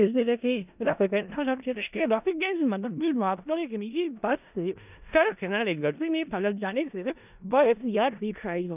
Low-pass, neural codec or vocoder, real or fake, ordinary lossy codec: 3.6 kHz; codec, 16 kHz in and 24 kHz out, 0.4 kbps, LongCat-Audio-Codec, four codebook decoder; fake; none